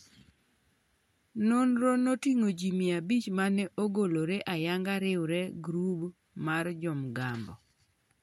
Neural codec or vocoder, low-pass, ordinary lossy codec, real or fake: none; 19.8 kHz; MP3, 64 kbps; real